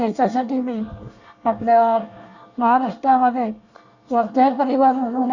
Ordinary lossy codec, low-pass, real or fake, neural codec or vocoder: Opus, 64 kbps; 7.2 kHz; fake; codec, 24 kHz, 1 kbps, SNAC